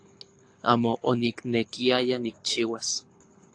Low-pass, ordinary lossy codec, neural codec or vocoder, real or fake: 9.9 kHz; AAC, 48 kbps; codec, 24 kHz, 6 kbps, HILCodec; fake